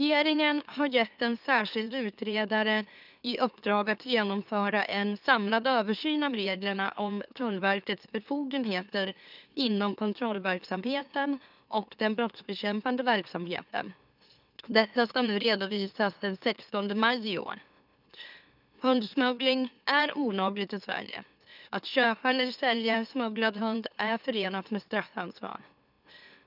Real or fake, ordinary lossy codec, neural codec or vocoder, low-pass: fake; none; autoencoder, 44.1 kHz, a latent of 192 numbers a frame, MeloTTS; 5.4 kHz